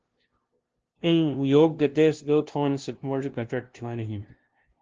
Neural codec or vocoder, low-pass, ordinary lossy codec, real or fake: codec, 16 kHz, 0.5 kbps, FunCodec, trained on LibriTTS, 25 frames a second; 7.2 kHz; Opus, 16 kbps; fake